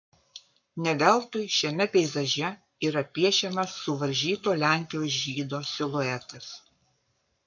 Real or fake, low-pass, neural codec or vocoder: fake; 7.2 kHz; codec, 44.1 kHz, 7.8 kbps, Pupu-Codec